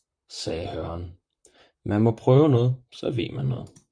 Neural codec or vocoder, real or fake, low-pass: vocoder, 44.1 kHz, 128 mel bands, Pupu-Vocoder; fake; 9.9 kHz